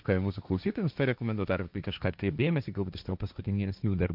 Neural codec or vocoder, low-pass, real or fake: codec, 16 kHz, 1.1 kbps, Voila-Tokenizer; 5.4 kHz; fake